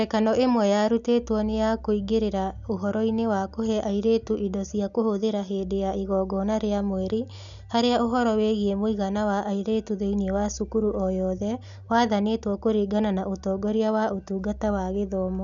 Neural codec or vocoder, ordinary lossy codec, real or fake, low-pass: none; none; real; 7.2 kHz